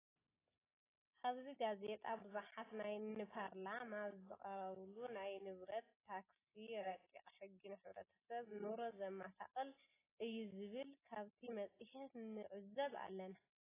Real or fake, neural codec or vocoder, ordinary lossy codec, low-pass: real; none; AAC, 16 kbps; 3.6 kHz